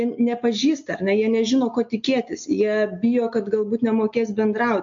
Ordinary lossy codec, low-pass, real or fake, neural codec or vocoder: MP3, 48 kbps; 7.2 kHz; real; none